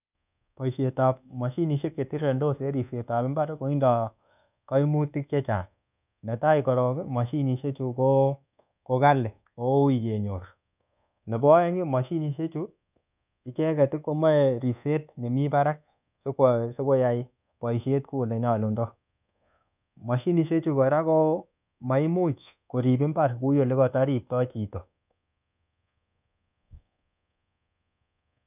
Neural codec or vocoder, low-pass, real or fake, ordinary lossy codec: codec, 24 kHz, 1.2 kbps, DualCodec; 3.6 kHz; fake; none